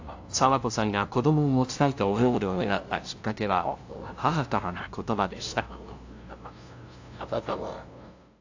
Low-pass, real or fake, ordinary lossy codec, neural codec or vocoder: 7.2 kHz; fake; AAC, 48 kbps; codec, 16 kHz, 0.5 kbps, FunCodec, trained on LibriTTS, 25 frames a second